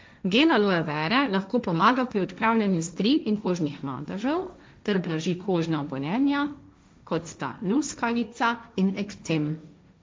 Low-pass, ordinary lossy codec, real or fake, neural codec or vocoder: none; none; fake; codec, 16 kHz, 1.1 kbps, Voila-Tokenizer